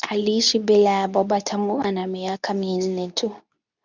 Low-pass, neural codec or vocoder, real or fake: 7.2 kHz; codec, 24 kHz, 0.9 kbps, WavTokenizer, medium speech release version 2; fake